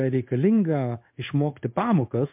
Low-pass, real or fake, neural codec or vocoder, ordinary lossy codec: 3.6 kHz; fake; codec, 16 kHz in and 24 kHz out, 1 kbps, XY-Tokenizer; MP3, 32 kbps